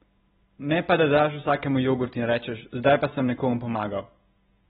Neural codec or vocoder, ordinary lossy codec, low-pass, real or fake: none; AAC, 16 kbps; 19.8 kHz; real